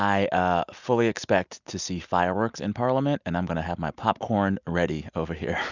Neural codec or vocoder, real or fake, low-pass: none; real; 7.2 kHz